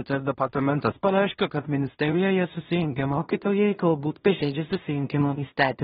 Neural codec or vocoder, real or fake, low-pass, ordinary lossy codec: codec, 16 kHz in and 24 kHz out, 0.4 kbps, LongCat-Audio-Codec, two codebook decoder; fake; 10.8 kHz; AAC, 16 kbps